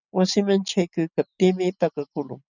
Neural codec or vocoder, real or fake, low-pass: none; real; 7.2 kHz